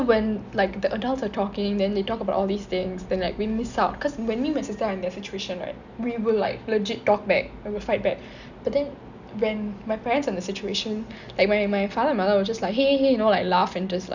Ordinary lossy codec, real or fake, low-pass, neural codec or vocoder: none; real; 7.2 kHz; none